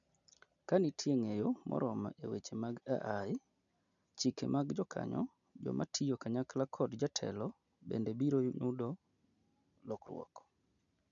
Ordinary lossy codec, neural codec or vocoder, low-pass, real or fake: none; none; 7.2 kHz; real